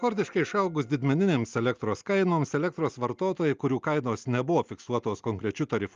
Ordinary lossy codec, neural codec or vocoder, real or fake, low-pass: Opus, 32 kbps; none; real; 7.2 kHz